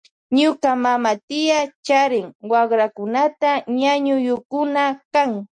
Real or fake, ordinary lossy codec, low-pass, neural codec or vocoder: real; MP3, 48 kbps; 9.9 kHz; none